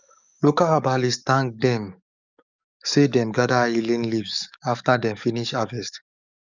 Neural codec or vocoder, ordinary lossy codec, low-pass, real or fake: codec, 44.1 kHz, 7.8 kbps, DAC; none; 7.2 kHz; fake